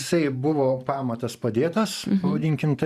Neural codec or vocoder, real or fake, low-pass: vocoder, 44.1 kHz, 128 mel bands every 512 samples, BigVGAN v2; fake; 14.4 kHz